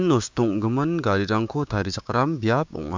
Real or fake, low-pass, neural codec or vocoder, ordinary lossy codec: fake; 7.2 kHz; codec, 16 kHz, 6 kbps, DAC; MP3, 64 kbps